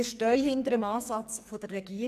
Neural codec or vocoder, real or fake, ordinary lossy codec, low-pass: codec, 44.1 kHz, 2.6 kbps, SNAC; fake; none; 14.4 kHz